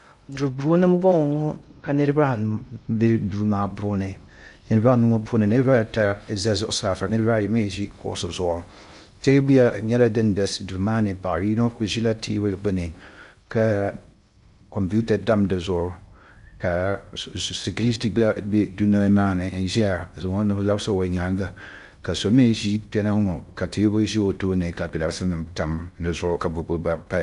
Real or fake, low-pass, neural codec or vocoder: fake; 10.8 kHz; codec, 16 kHz in and 24 kHz out, 0.6 kbps, FocalCodec, streaming, 2048 codes